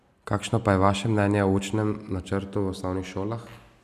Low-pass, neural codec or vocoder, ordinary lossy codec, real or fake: 14.4 kHz; none; none; real